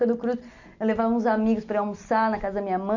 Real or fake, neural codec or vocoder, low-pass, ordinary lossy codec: real; none; 7.2 kHz; none